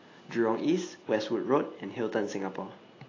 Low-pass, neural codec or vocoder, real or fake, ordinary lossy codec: 7.2 kHz; none; real; AAC, 32 kbps